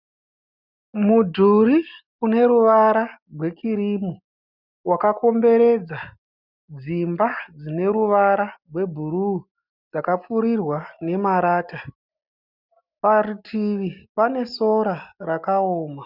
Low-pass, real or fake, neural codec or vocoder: 5.4 kHz; real; none